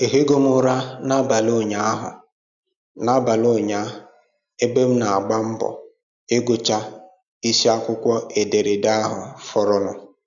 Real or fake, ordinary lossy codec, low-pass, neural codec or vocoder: real; none; 7.2 kHz; none